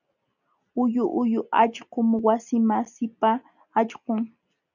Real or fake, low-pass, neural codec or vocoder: real; 7.2 kHz; none